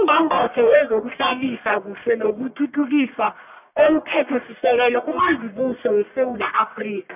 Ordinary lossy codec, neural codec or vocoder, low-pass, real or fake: none; codec, 44.1 kHz, 1.7 kbps, Pupu-Codec; 3.6 kHz; fake